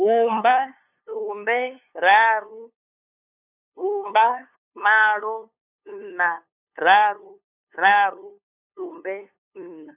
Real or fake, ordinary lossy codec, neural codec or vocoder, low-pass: fake; none; codec, 16 kHz, 2 kbps, FunCodec, trained on LibriTTS, 25 frames a second; 3.6 kHz